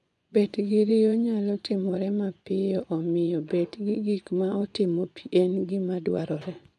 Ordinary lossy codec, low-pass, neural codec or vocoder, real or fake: none; none; vocoder, 24 kHz, 100 mel bands, Vocos; fake